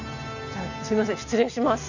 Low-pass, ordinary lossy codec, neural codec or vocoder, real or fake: 7.2 kHz; none; none; real